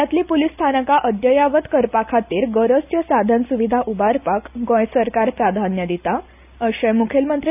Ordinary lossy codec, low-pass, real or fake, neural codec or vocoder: none; 3.6 kHz; real; none